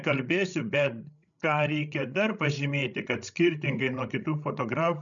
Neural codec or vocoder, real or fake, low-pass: codec, 16 kHz, 16 kbps, FreqCodec, larger model; fake; 7.2 kHz